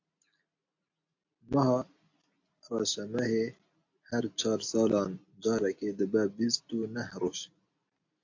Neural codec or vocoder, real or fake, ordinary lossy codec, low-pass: none; real; AAC, 48 kbps; 7.2 kHz